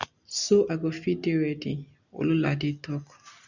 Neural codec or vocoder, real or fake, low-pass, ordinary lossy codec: none; real; 7.2 kHz; none